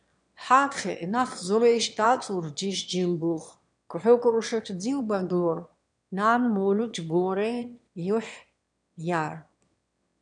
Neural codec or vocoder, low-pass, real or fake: autoencoder, 22.05 kHz, a latent of 192 numbers a frame, VITS, trained on one speaker; 9.9 kHz; fake